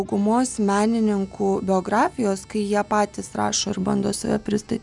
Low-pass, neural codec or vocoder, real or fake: 10.8 kHz; none; real